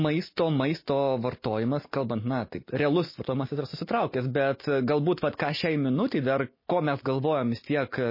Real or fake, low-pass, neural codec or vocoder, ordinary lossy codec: real; 5.4 kHz; none; MP3, 24 kbps